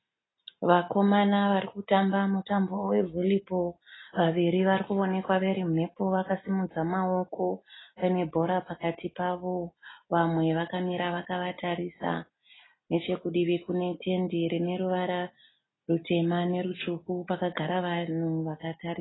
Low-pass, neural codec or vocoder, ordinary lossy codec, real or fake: 7.2 kHz; none; AAC, 16 kbps; real